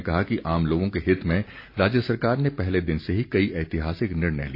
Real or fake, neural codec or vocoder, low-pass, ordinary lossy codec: real; none; 5.4 kHz; AAC, 32 kbps